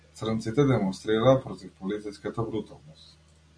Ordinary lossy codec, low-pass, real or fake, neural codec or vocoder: MP3, 64 kbps; 9.9 kHz; real; none